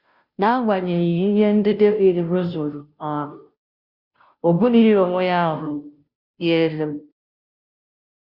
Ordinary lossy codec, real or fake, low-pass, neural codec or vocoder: Opus, 64 kbps; fake; 5.4 kHz; codec, 16 kHz, 0.5 kbps, FunCodec, trained on Chinese and English, 25 frames a second